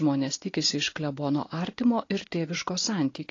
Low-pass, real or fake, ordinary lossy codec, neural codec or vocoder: 7.2 kHz; real; AAC, 32 kbps; none